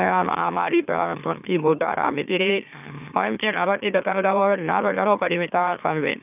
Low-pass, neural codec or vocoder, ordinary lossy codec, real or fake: 3.6 kHz; autoencoder, 44.1 kHz, a latent of 192 numbers a frame, MeloTTS; none; fake